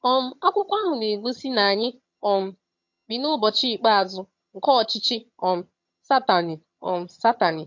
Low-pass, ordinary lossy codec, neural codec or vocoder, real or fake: 7.2 kHz; MP3, 48 kbps; vocoder, 22.05 kHz, 80 mel bands, HiFi-GAN; fake